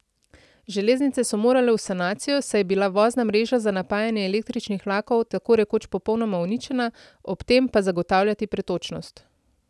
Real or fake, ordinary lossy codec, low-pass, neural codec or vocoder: real; none; none; none